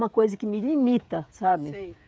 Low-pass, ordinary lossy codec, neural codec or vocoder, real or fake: none; none; codec, 16 kHz, 16 kbps, FreqCodec, smaller model; fake